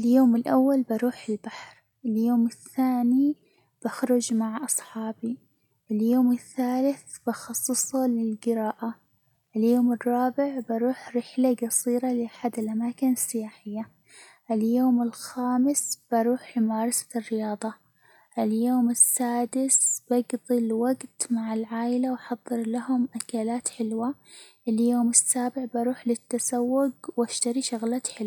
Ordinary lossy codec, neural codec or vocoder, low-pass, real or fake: none; none; 19.8 kHz; real